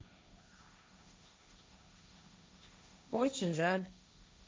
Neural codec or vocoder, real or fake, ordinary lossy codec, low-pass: codec, 16 kHz, 1.1 kbps, Voila-Tokenizer; fake; none; none